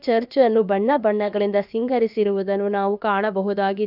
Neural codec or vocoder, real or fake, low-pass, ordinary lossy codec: codec, 16 kHz, about 1 kbps, DyCAST, with the encoder's durations; fake; 5.4 kHz; none